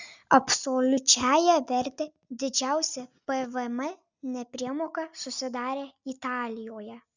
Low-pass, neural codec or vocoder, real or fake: 7.2 kHz; none; real